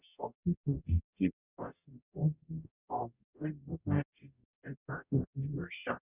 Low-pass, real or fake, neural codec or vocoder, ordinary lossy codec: 3.6 kHz; fake; codec, 44.1 kHz, 0.9 kbps, DAC; none